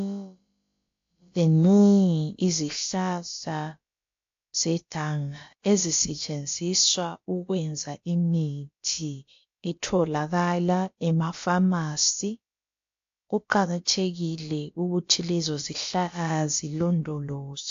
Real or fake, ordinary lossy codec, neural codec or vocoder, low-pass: fake; AAC, 48 kbps; codec, 16 kHz, about 1 kbps, DyCAST, with the encoder's durations; 7.2 kHz